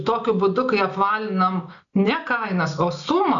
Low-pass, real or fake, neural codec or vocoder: 7.2 kHz; real; none